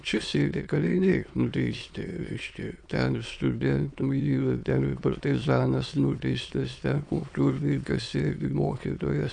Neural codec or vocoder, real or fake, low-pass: autoencoder, 22.05 kHz, a latent of 192 numbers a frame, VITS, trained on many speakers; fake; 9.9 kHz